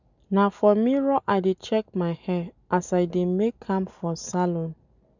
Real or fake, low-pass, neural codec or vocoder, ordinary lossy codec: real; 7.2 kHz; none; none